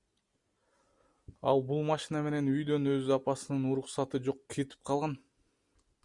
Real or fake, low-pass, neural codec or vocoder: real; 10.8 kHz; none